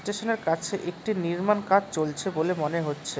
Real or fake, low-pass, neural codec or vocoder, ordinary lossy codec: real; none; none; none